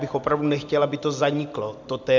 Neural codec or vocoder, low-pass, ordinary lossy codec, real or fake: none; 7.2 kHz; MP3, 64 kbps; real